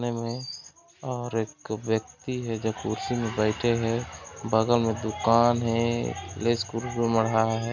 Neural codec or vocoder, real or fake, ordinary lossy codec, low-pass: none; real; none; 7.2 kHz